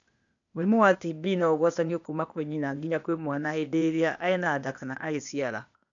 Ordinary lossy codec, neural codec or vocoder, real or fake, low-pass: none; codec, 16 kHz, 0.8 kbps, ZipCodec; fake; 7.2 kHz